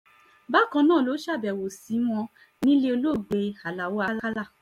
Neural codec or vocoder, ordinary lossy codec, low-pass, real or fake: none; MP3, 64 kbps; 19.8 kHz; real